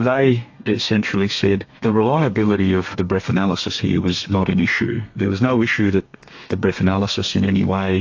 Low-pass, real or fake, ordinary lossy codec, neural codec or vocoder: 7.2 kHz; fake; AAC, 48 kbps; codec, 32 kHz, 1.9 kbps, SNAC